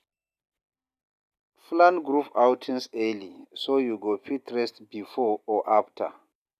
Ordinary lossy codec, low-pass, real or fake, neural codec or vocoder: none; 14.4 kHz; real; none